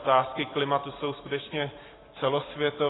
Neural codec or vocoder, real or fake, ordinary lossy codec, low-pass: none; real; AAC, 16 kbps; 7.2 kHz